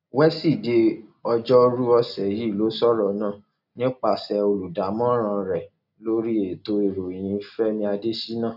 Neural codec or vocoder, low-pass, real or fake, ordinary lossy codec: none; 5.4 kHz; real; none